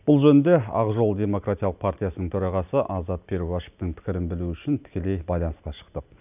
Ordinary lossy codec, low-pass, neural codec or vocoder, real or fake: none; 3.6 kHz; none; real